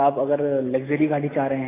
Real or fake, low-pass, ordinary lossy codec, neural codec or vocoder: real; 3.6 kHz; AAC, 16 kbps; none